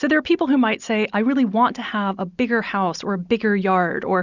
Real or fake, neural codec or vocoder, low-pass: real; none; 7.2 kHz